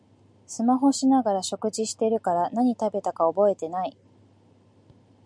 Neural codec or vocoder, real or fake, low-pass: none; real; 9.9 kHz